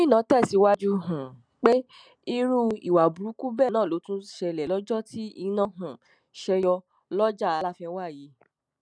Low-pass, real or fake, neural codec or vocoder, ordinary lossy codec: 9.9 kHz; real; none; none